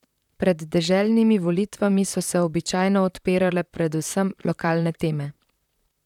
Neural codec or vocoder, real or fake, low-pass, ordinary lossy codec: vocoder, 44.1 kHz, 128 mel bands, Pupu-Vocoder; fake; 19.8 kHz; none